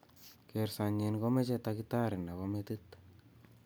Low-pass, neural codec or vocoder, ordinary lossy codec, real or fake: none; none; none; real